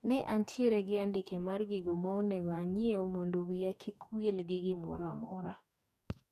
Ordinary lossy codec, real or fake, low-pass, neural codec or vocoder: none; fake; 14.4 kHz; codec, 44.1 kHz, 2.6 kbps, DAC